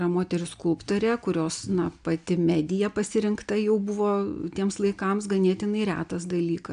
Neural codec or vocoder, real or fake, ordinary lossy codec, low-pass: none; real; AAC, 96 kbps; 9.9 kHz